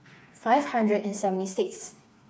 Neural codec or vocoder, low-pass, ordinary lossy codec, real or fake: codec, 16 kHz, 4 kbps, FreqCodec, smaller model; none; none; fake